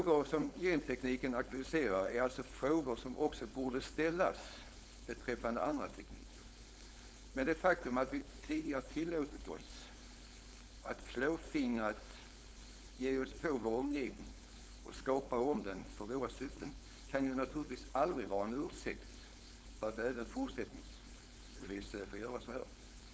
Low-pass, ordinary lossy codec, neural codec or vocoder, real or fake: none; none; codec, 16 kHz, 4.8 kbps, FACodec; fake